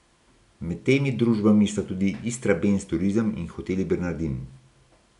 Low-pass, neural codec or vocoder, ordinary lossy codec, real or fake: 10.8 kHz; none; none; real